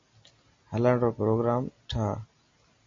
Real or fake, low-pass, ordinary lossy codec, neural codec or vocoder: real; 7.2 kHz; MP3, 32 kbps; none